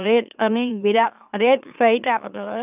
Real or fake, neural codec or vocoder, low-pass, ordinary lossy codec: fake; autoencoder, 44.1 kHz, a latent of 192 numbers a frame, MeloTTS; 3.6 kHz; none